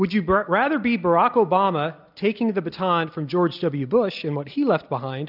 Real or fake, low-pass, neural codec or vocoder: real; 5.4 kHz; none